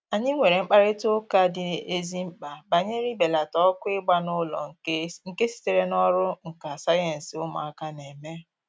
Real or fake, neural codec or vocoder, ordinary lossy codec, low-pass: real; none; none; none